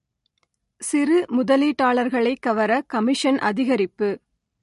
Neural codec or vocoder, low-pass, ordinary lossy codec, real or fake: none; 14.4 kHz; MP3, 48 kbps; real